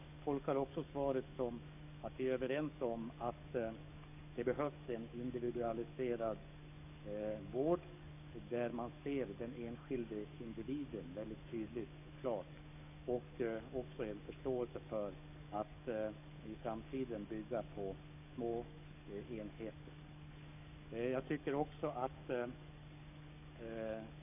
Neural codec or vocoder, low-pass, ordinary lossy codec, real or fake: codec, 44.1 kHz, 7.8 kbps, DAC; 3.6 kHz; none; fake